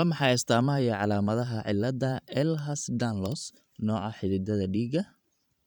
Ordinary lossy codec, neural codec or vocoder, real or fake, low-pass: none; vocoder, 44.1 kHz, 128 mel bands every 512 samples, BigVGAN v2; fake; 19.8 kHz